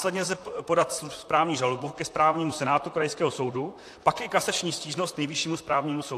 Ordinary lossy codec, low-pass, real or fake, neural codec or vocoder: AAC, 64 kbps; 14.4 kHz; fake; vocoder, 44.1 kHz, 128 mel bands, Pupu-Vocoder